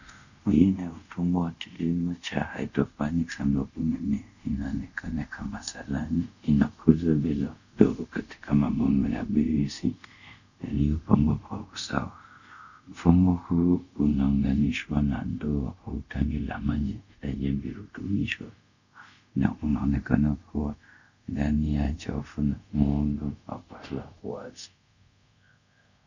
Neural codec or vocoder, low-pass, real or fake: codec, 24 kHz, 0.5 kbps, DualCodec; 7.2 kHz; fake